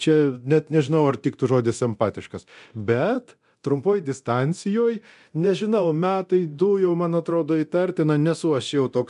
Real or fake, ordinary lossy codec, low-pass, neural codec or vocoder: fake; MP3, 96 kbps; 10.8 kHz; codec, 24 kHz, 0.9 kbps, DualCodec